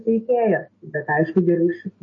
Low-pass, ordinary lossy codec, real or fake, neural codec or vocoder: 7.2 kHz; MP3, 32 kbps; real; none